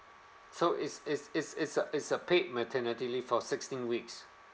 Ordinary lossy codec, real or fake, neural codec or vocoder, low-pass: none; real; none; none